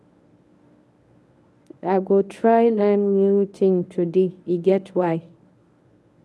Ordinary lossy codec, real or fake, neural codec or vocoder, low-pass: none; fake; codec, 24 kHz, 0.9 kbps, WavTokenizer, medium speech release version 1; none